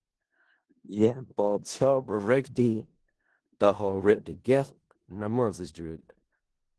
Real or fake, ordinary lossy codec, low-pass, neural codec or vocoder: fake; Opus, 16 kbps; 10.8 kHz; codec, 16 kHz in and 24 kHz out, 0.4 kbps, LongCat-Audio-Codec, four codebook decoder